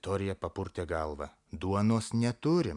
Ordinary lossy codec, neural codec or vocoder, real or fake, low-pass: MP3, 96 kbps; none; real; 10.8 kHz